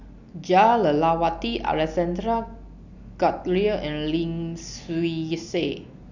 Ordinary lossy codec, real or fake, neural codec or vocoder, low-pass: none; real; none; 7.2 kHz